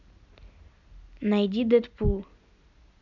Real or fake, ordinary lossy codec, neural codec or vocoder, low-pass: real; none; none; 7.2 kHz